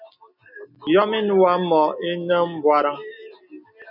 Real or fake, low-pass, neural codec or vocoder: real; 5.4 kHz; none